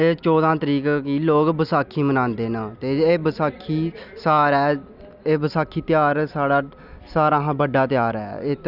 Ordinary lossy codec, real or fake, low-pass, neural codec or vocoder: none; real; 5.4 kHz; none